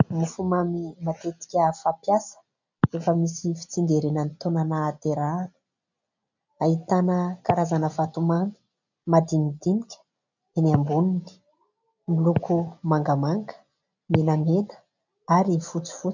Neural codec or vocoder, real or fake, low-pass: none; real; 7.2 kHz